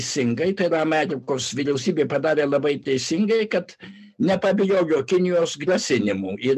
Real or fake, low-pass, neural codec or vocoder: real; 14.4 kHz; none